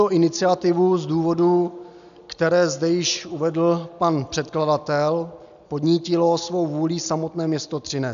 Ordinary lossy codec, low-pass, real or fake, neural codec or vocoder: AAC, 96 kbps; 7.2 kHz; real; none